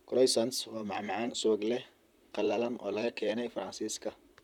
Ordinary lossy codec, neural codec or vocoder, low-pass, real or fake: none; vocoder, 44.1 kHz, 128 mel bands, Pupu-Vocoder; 19.8 kHz; fake